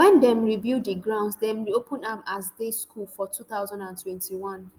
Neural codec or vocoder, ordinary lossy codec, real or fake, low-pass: none; Opus, 32 kbps; real; 19.8 kHz